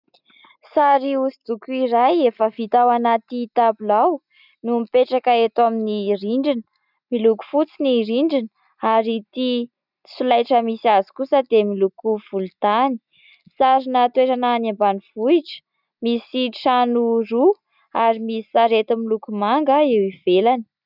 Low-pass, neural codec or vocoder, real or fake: 5.4 kHz; none; real